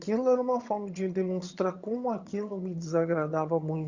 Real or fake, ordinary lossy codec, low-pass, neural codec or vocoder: fake; Opus, 64 kbps; 7.2 kHz; vocoder, 22.05 kHz, 80 mel bands, HiFi-GAN